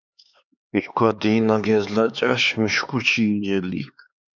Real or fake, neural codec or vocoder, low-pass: fake; codec, 16 kHz, 4 kbps, X-Codec, HuBERT features, trained on LibriSpeech; 7.2 kHz